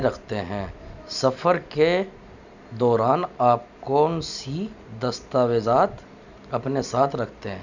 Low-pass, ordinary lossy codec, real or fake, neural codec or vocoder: 7.2 kHz; none; real; none